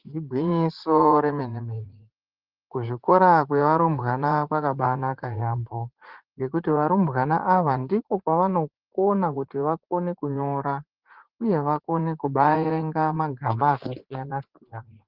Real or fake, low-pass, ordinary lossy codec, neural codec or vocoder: fake; 5.4 kHz; Opus, 16 kbps; vocoder, 44.1 kHz, 80 mel bands, Vocos